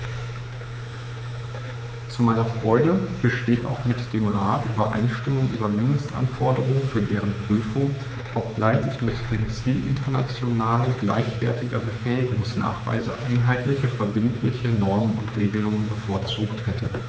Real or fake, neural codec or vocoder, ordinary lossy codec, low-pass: fake; codec, 16 kHz, 4 kbps, X-Codec, HuBERT features, trained on general audio; none; none